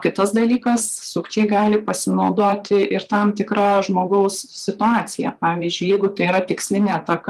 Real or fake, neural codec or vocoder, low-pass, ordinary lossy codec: fake; vocoder, 44.1 kHz, 128 mel bands, Pupu-Vocoder; 14.4 kHz; Opus, 32 kbps